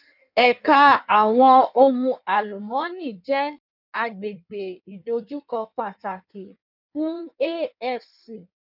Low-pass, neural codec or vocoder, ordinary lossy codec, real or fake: 5.4 kHz; codec, 16 kHz in and 24 kHz out, 1.1 kbps, FireRedTTS-2 codec; none; fake